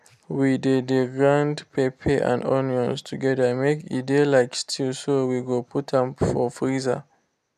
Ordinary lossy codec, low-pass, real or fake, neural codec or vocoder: none; 14.4 kHz; real; none